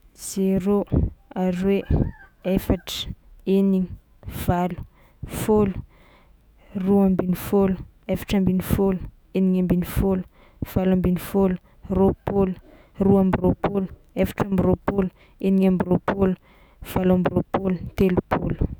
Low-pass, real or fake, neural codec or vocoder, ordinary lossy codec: none; fake; autoencoder, 48 kHz, 128 numbers a frame, DAC-VAE, trained on Japanese speech; none